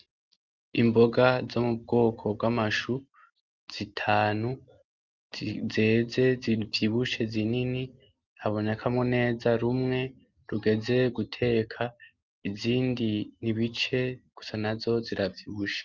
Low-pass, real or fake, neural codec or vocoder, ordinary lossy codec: 7.2 kHz; real; none; Opus, 32 kbps